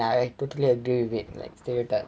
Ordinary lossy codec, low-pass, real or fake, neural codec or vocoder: none; none; real; none